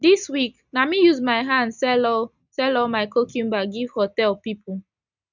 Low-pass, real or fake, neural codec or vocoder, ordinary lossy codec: 7.2 kHz; real; none; none